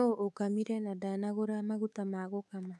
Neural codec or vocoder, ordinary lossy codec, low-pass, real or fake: codec, 24 kHz, 3.1 kbps, DualCodec; none; none; fake